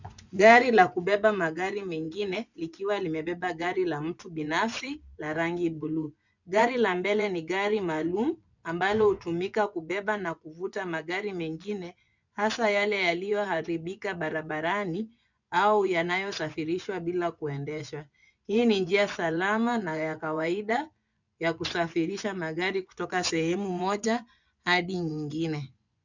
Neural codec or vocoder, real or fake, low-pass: vocoder, 24 kHz, 100 mel bands, Vocos; fake; 7.2 kHz